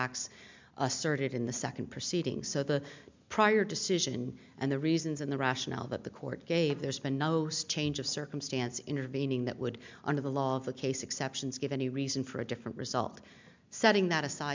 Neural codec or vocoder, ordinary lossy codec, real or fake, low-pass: none; MP3, 64 kbps; real; 7.2 kHz